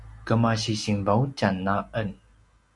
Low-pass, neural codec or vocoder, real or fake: 10.8 kHz; none; real